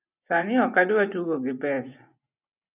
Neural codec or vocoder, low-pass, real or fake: vocoder, 24 kHz, 100 mel bands, Vocos; 3.6 kHz; fake